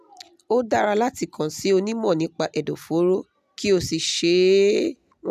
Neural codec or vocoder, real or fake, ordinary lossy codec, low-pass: none; real; none; 14.4 kHz